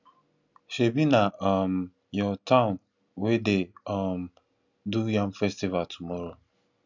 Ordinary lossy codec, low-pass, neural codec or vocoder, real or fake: none; 7.2 kHz; none; real